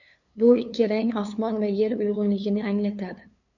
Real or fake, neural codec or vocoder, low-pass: fake; codec, 16 kHz, 2 kbps, FunCodec, trained on LibriTTS, 25 frames a second; 7.2 kHz